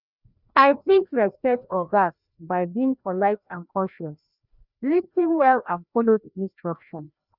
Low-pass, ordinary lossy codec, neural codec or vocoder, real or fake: 5.4 kHz; none; codec, 16 kHz, 1 kbps, FreqCodec, larger model; fake